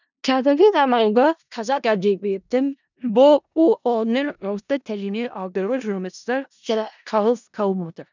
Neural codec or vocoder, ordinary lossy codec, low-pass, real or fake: codec, 16 kHz in and 24 kHz out, 0.4 kbps, LongCat-Audio-Codec, four codebook decoder; none; 7.2 kHz; fake